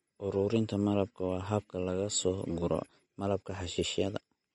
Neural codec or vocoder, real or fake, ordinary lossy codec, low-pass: none; real; MP3, 48 kbps; 19.8 kHz